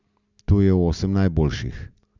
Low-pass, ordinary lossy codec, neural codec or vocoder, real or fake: 7.2 kHz; none; none; real